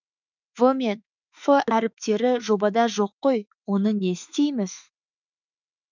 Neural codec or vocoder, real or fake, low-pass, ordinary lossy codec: autoencoder, 48 kHz, 32 numbers a frame, DAC-VAE, trained on Japanese speech; fake; 7.2 kHz; none